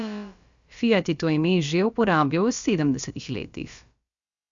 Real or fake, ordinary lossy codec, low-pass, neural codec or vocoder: fake; Opus, 64 kbps; 7.2 kHz; codec, 16 kHz, about 1 kbps, DyCAST, with the encoder's durations